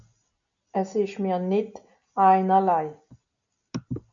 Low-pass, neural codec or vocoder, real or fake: 7.2 kHz; none; real